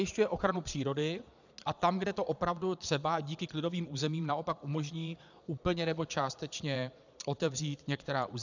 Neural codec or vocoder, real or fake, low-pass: vocoder, 22.05 kHz, 80 mel bands, Vocos; fake; 7.2 kHz